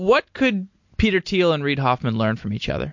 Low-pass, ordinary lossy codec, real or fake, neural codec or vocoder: 7.2 kHz; MP3, 48 kbps; real; none